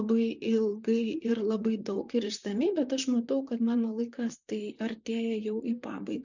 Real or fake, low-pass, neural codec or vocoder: fake; 7.2 kHz; vocoder, 44.1 kHz, 128 mel bands, Pupu-Vocoder